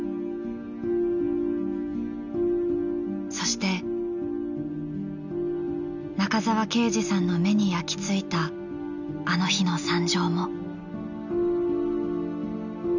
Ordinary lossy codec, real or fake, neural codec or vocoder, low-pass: none; real; none; 7.2 kHz